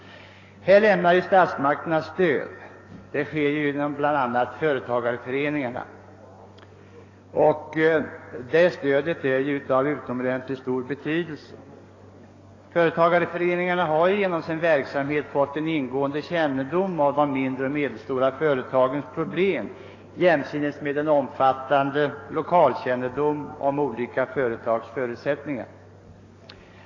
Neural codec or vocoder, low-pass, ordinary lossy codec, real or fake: codec, 44.1 kHz, 7.8 kbps, DAC; 7.2 kHz; AAC, 32 kbps; fake